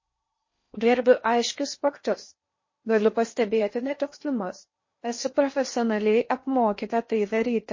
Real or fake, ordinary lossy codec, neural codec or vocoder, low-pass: fake; MP3, 32 kbps; codec, 16 kHz in and 24 kHz out, 0.6 kbps, FocalCodec, streaming, 4096 codes; 7.2 kHz